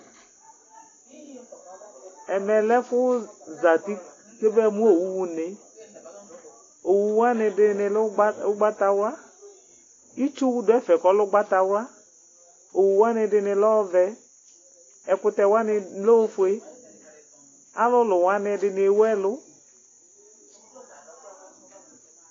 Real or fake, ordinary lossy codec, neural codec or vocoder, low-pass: real; AAC, 32 kbps; none; 7.2 kHz